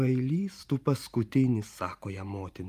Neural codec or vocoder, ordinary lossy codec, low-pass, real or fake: vocoder, 44.1 kHz, 128 mel bands every 512 samples, BigVGAN v2; Opus, 32 kbps; 14.4 kHz; fake